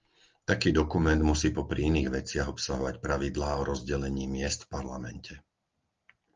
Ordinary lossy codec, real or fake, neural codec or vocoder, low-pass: Opus, 32 kbps; real; none; 7.2 kHz